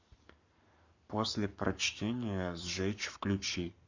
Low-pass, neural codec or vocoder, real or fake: 7.2 kHz; codec, 44.1 kHz, 7.8 kbps, Pupu-Codec; fake